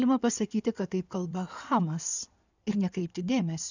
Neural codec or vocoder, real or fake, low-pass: codec, 16 kHz in and 24 kHz out, 2.2 kbps, FireRedTTS-2 codec; fake; 7.2 kHz